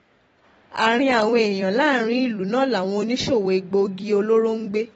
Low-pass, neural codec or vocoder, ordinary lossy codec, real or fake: 19.8 kHz; vocoder, 44.1 kHz, 128 mel bands every 256 samples, BigVGAN v2; AAC, 24 kbps; fake